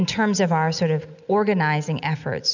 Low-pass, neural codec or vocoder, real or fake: 7.2 kHz; none; real